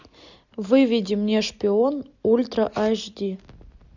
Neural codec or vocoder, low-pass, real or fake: none; 7.2 kHz; real